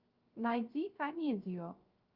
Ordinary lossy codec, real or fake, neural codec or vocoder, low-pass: Opus, 32 kbps; fake; codec, 16 kHz, 0.3 kbps, FocalCodec; 5.4 kHz